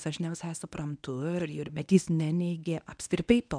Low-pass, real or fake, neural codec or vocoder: 9.9 kHz; fake; codec, 24 kHz, 0.9 kbps, WavTokenizer, medium speech release version 1